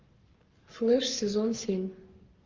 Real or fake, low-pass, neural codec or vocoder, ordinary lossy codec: fake; 7.2 kHz; codec, 44.1 kHz, 7.8 kbps, Pupu-Codec; Opus, 32 kbps